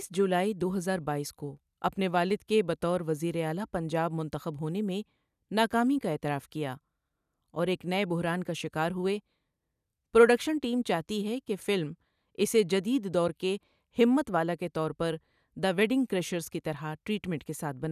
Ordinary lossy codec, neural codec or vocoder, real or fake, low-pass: none; none; real; 14.4 kHz